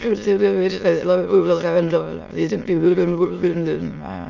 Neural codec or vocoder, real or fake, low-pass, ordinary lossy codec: autoencoder, 22.05 kHz, a latent of 192 numbers a frame, VITS, trained on many speakers; fake; 7.2 kHz; none